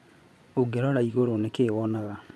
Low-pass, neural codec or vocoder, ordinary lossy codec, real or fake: none; vocoder, 24 kHz, 100 mel bands, Vocos; none; fake